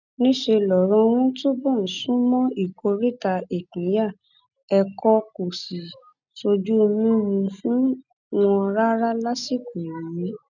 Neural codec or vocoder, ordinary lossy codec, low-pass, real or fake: none; none; 7.2 kHz; real